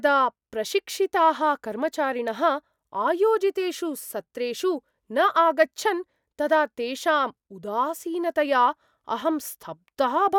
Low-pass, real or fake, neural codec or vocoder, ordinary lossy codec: 19.8 kHz; real; none; none